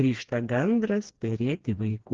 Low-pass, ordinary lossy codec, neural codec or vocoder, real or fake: 7.2 kHz; Opus, 16 kbps; codec, 16 kHz, 2 kbps, FreqCodec, smaller model; fake